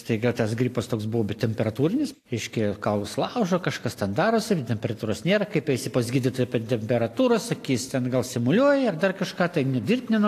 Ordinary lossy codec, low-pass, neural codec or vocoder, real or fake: AAC, 64 kbps; 14.4 kHz; none; real